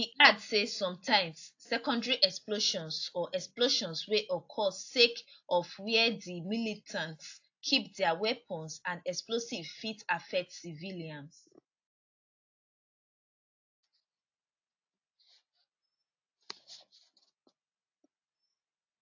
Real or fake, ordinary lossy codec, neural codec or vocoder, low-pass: real; AAC, 48 kbps; none; 7.2 kHz